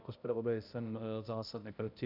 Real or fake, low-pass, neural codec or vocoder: fake; 5.4 kHz; codec, 16 kHz, 0.5 kbps, X-Codec, HuBERT features, trained on balanced general audio